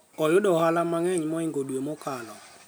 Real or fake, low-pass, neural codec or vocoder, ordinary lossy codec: real; none; none; none